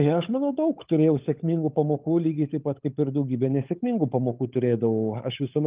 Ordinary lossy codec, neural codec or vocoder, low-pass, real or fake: Opus, 32 kbps; codec, 16 kHz, 16 kbps, FunCodec, trained on LibriTTS, 50 frames a second; 3.6 kHz; fake